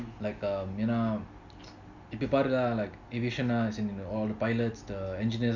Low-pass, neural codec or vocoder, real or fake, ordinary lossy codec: 7.2 kHz; none; real; none